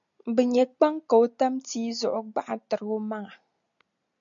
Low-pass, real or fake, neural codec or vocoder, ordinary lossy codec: 7.2 kHz; real; none; MP3, 64 kbps